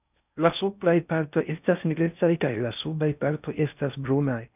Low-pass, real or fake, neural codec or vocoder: 3.6 kHz; fake; codec, 16 kHz in and 24 kHz out, 0.6 kbps, FocalCodec, streaming, 4096 codes